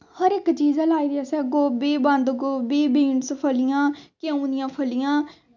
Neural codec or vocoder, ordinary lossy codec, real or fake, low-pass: none; none; real; 7.2 kHz